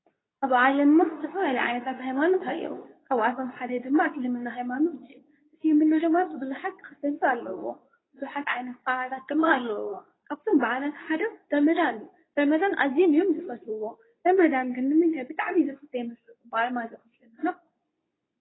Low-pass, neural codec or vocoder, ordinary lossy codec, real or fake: 7.2 kHz; codec, 24 kHz, 0.9 kbps, WavTokenizer, medium speech release version 2; AAC, 16 kbps; fake